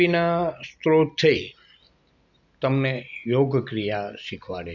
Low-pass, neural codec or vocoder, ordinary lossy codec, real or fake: 7.2 kHz; none; none; real